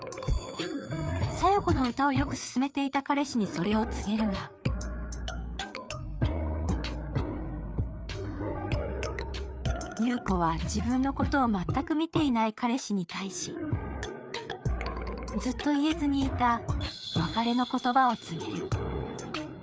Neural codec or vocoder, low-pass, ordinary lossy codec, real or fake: codec, 16 kHz, 4 kbps, FreqCodec, larger model; none; none; fake